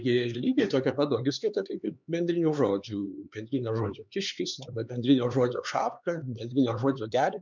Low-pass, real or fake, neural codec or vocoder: 7.2 kHz; fake; codec, 16 kHz, 4 kbps, X-Codec, HuBERT features, trained on LibriSpeech